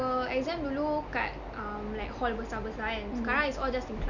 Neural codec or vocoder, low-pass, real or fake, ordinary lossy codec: none; 7.2 kHz; real; none